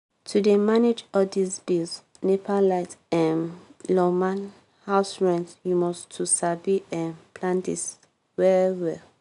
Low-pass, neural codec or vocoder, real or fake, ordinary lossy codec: 10.8 kHz; none; real; none